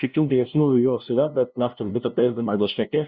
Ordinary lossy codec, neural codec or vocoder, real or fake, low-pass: Opus, 64 kbps; codec, 16 kHz, 0.5 kbps, FunCodec, trained on LibriTTS, 25 frames a second; fake; 7.2 kHz